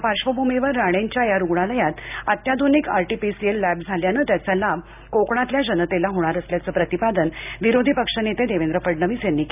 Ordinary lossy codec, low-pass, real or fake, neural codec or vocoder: none; 3.6 kHz; real; none